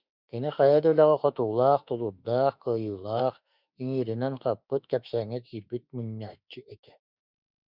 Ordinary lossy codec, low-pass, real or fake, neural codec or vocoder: Opus, 64 kbps; 5.4 kHz; fake; autoencoder, 48 kHz, 32 numbers a frame, DAC-VAE, trained on Japanese speech